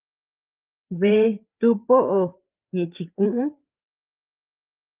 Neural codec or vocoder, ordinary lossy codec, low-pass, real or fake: codec, 16 kHz, 4 kbps, FreqCodec, larger model; Opus, 32 kbps; 3.6 kHz; fake